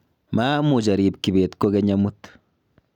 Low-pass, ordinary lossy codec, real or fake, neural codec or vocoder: 19.8 kHz; none; real; none